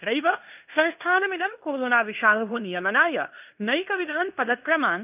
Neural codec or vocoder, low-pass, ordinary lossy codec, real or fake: codec, 16 kHz in and 24 kHz out, 0.9 kbps, LongCat-Audio-Codec, fine tuned four codebook decoder; 3.6 kHz; none; fake